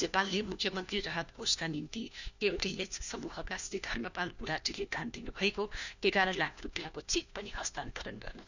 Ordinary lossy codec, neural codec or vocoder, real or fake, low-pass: none; codec, 16 kHz, 1 kbps, FunCodec, trained on LibriTTS, 50 frames a second; fake; 7.2 kHz